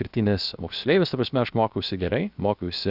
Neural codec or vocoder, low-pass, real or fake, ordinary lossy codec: codec, 16 kHz, 0.7 kbps, FocalCodec; 5.4 kHz; fake; Opus, 64 kbps